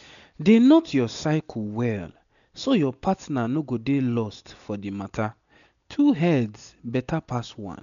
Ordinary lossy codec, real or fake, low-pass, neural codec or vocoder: none; real; 7.2 kHz; none